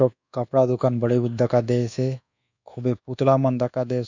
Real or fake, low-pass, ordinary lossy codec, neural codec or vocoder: fake; 7.2 kHz; none; codec, 24 kHz, 1.2 kbps, DualCodec